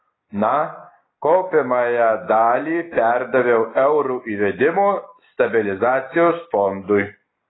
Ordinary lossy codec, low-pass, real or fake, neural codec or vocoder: AAC, 16 kbps; 7.2 kHz; real; none